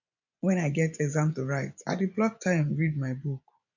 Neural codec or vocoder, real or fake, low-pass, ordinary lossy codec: vocoder, 24 kHz, 100 mel bands, Vocos; fake; 7.2 kHz; none